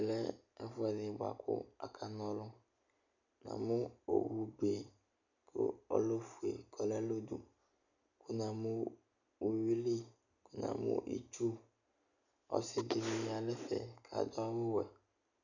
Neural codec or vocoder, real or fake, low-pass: none; real; 7.2 kHz